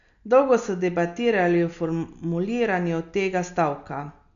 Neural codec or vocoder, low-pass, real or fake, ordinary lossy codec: none; 7.2 kHz; real; none